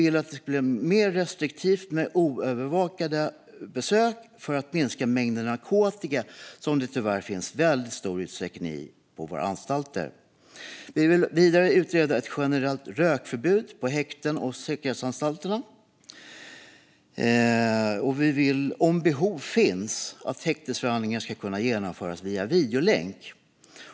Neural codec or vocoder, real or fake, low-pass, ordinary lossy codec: none; real; none; none